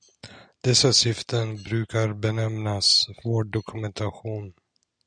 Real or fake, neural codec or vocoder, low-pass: real; none; 9.9 kHz